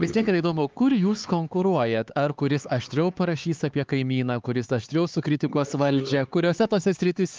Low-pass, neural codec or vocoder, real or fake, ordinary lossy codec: 7.2 kHz; codec, 16 kHz, 4 kbps, X-Codec, HuBERT features, trained on LibriSpeech; fake; Opus, 32 kbps